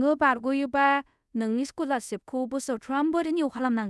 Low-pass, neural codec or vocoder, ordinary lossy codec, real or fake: none; codec, 24 kHz, 0.5 kbps, DualCodec; none; fake